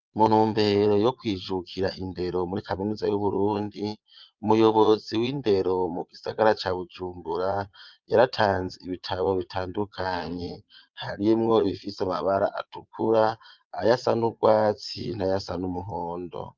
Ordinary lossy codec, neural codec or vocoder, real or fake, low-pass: Opus, 32 kbps; vocoder, 22.05 kHz, 80 mel bands, Vocos; fake; 7.2 kHz